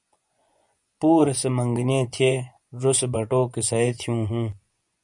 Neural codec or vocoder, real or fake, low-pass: vocoder, 24 kHz, 100 mel bands, Vocos; fake; 10.8 kHz